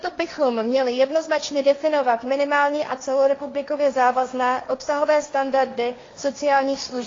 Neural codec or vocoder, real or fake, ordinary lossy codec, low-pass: codec, 16 kHz, 1.1 kbps, Voila-Tokenizer; fake; AAC, 32 kbps; 7.2 kHz